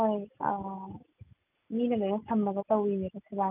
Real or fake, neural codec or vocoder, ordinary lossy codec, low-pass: real; none; none; 3.6 kHz